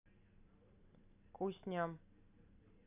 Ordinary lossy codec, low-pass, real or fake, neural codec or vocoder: none; 3.6 kHz; real; none